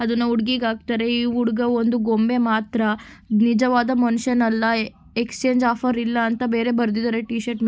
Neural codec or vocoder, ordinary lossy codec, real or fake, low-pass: none; none; real; none